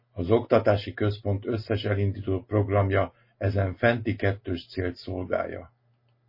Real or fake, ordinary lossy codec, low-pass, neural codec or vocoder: real; MP3, 24 kbps; 5.4 kHz; none